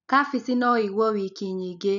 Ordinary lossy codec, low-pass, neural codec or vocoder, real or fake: none; 7.2 kHz; none; real